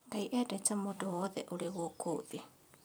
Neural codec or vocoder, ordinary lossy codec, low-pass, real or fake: vocoder, 44.1 kHz, 128 mel bands every 256 samples, BigVGAN v2; none; none; fake